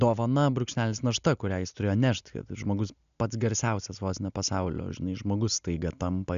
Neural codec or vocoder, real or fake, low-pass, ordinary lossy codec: none; real; 7.2 kHz; MP3, 96 kbps